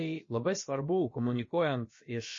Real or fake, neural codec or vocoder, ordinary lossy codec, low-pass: fake; codec, 16 kHz, about 1 kbps, DyCAST, with the encoder's durations; MP3, 32 kbps; 7.2 kHz